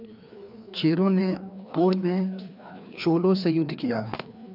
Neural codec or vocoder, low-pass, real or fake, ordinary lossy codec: codec, 16 kHz, 2 kbps, FreqCodec, larger model; 5.4 kHz; fake; none